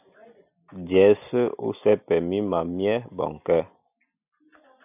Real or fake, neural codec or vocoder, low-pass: real; none; 3.6 kHz